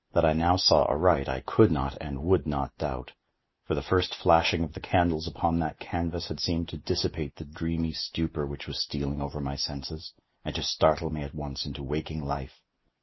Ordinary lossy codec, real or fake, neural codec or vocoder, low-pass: MP3, 24 kbps; real; none; 7.2 kHz